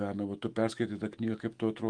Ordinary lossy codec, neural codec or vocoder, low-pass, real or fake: Opus, 32 kbps; autoencoder, 48 kHz, 128 numbers a frame, DAC-VAE, trained on Japanese speech; 9.9 kHz; fake